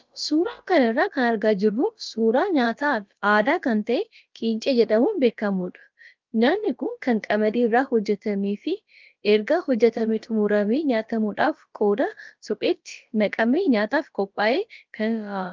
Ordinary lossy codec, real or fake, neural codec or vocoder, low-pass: Opus, 32 kbps; fake; codec, 16 kHz, about 1 kbps, DyCAST, with the encoder's durations; 7.2 kHz